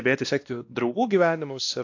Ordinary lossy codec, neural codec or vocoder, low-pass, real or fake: AAC, 48 kbps; codec, 16 kHz, 1 kbps, X-Codec, WavLM features, trained on Multilingual LibriSpeech; 7.2 kHz; fake